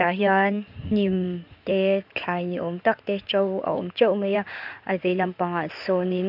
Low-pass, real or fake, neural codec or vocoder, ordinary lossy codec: 5.4 kHz; fake; codec, 16 kHz in and 24 kHz out, 2.2 kbps, FireRedTTS-2 codec; none